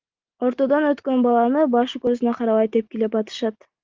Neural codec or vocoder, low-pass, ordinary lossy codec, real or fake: none; 7.2 kHz; Opus, 32 kbps; real